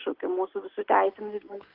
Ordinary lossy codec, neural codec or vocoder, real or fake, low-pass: Opus, 16 kbps; none; real; 5.4 kHz